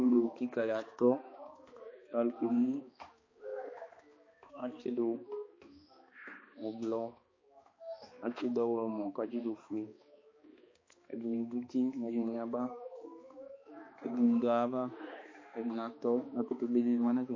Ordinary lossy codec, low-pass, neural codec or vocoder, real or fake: MP3, 32 kbps; 7.2 kHz; codec, 16 kHz, 2 kbps, X-Codec, HuBERT features, trained on balanced general audio; fake